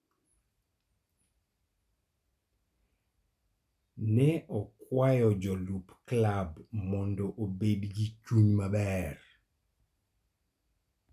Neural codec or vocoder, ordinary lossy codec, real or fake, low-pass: none; none; real; 14.4 kHz